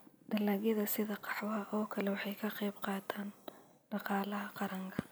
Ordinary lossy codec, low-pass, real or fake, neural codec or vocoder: none; none; real; none